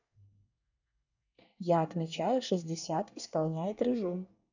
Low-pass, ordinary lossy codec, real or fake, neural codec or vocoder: 7.2 kHz; none; fake; codec, 24 kHz, 1 kbps, SNAC